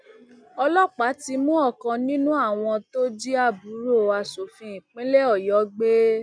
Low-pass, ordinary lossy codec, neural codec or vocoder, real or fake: 9.9 kHz; none; none; real